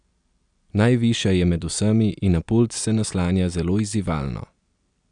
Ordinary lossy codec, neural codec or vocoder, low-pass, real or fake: none; none; 9.9 kHz; real